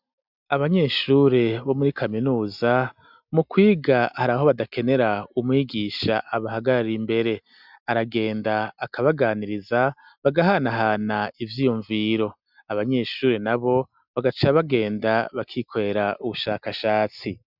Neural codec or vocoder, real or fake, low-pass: none; real; 5.4 kHz